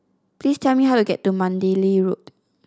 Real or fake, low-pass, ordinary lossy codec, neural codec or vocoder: real; none; none; none